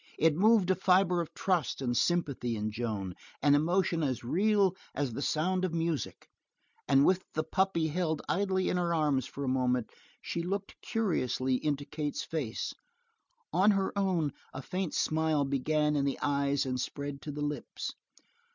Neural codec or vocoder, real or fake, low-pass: none; real; 7.2 kHz